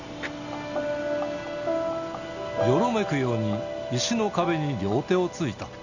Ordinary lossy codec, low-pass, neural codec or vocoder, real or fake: none; 7.2 kHz; none; real